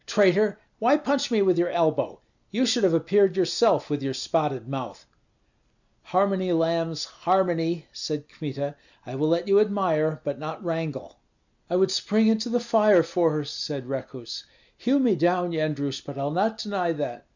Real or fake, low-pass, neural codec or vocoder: real; 7.2 kHz; none